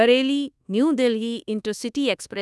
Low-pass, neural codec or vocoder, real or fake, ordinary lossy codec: none; codec, 24 kHz, 1.2 kbps, DualCodec; fake; none